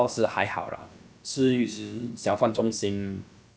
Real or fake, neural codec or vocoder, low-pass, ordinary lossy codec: fake; codec, 16 kHz, about 1 kbps, DyCAST, with the encoder's durations; none; none